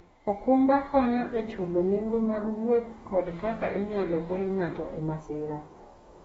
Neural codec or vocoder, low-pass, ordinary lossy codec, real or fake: codec, 44.1 kHz, 2.6 kbps, DAC; 19.8 kHz; AAC, 24 kbps; fake